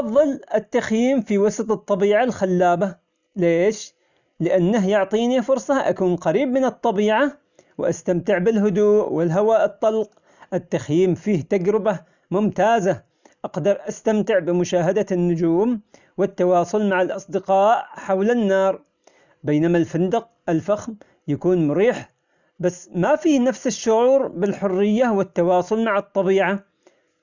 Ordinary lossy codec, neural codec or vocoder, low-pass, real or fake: none; none; 7.2 kHz; real